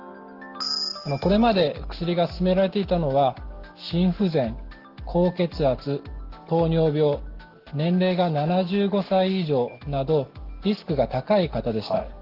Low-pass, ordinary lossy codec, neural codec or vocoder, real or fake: 5.4 kHz; Opus, 16 kbps; none; real